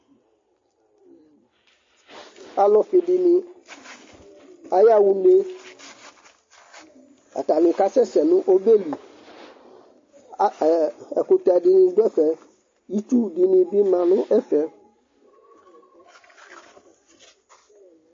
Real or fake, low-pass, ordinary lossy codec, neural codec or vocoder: real; 7.2 kHz; MP3, 32 kbps; none